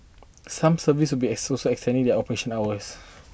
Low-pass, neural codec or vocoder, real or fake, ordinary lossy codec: none; none; real; none